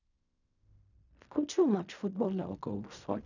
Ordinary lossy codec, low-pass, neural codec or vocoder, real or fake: none; 7.2 kHz; codec, 16 kHz in and 24 kHz out, 0.4 kbps, LongCat-Audio-Codec, fine tuned four codebook decoder; fake